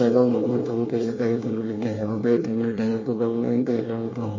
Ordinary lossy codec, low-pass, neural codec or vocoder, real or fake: MP3, 32 kbps; 7.2 kHz; codec, 24 kHz, 1 kbps, SNAC; fake